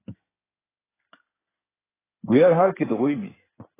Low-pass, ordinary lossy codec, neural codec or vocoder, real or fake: 3.6 kHz; AAC, 16 kbps; codec, 16 kHz in and 24 kHz out, 2.2 kbps, FireRedTTS-2 codec; fake